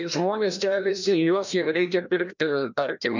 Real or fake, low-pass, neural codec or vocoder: fake; 7.2 kHz; codec, 16 kHz, 1 kbps, FreqCodec, larger model